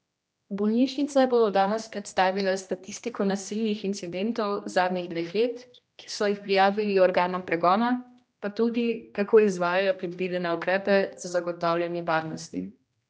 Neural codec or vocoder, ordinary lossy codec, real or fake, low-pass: codec, 16 kHz, 1 kbps, X-Codec, HuBERT features, trained on general audio; none; fake; none